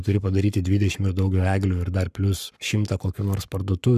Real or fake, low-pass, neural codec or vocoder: fake; 14.4 kHz; codec, 44.1 kHz, 7.8 kbps, Pupu-Codec